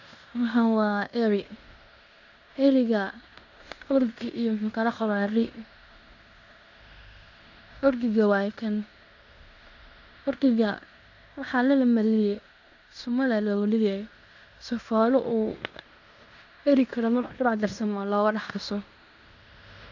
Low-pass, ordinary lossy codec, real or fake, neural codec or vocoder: 7.2 kHz; none; fake; codec, 16 kHz in and 24 kHz out, 0.9 kbps, LongCat-Audio-Codec, fine tuned four codebook decoder